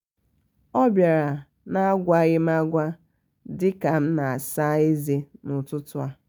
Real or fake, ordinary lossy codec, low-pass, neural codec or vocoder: real; none; none; none